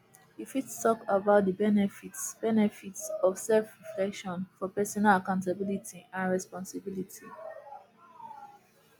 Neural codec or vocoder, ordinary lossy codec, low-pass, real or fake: none; none; none; real